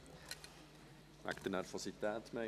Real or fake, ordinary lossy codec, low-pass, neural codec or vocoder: fake; none; 14.4 kHz; vocoder, 44.1 kHz, 128 mel bands every 256 samples, BigVGAN v2